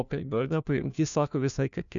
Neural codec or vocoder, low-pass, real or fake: codec, 16 kHz, 1 kbps, FunCodec, trained on LibriTTS, 50 frames a second; 7.2 kHz; fake